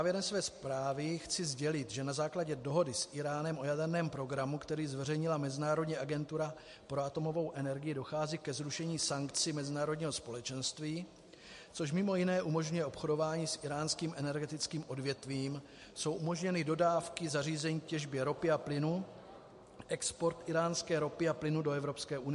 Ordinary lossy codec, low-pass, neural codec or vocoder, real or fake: MP3, 48 kbps; 14.4 kHz; none; real